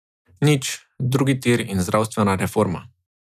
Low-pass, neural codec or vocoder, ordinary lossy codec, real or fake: 14.4 kHz; none; none; real